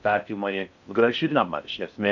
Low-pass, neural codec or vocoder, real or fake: 7.2 kHz; codec, 16 kHz in and 24 kHz out, 0.6 kbps, FocalCodec, streaming, 4096 codes; fake